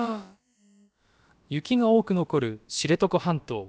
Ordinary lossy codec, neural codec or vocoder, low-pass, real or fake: none; codec, 16 kHz, about 1 kbps, DyCAST, with the encoder's durations; none; fake